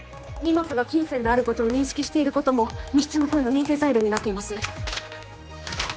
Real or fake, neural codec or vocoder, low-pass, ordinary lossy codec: fake; codec, 16 kHz, 2 kbps, X-Codec, HuBERT features, trained on general audio; none; none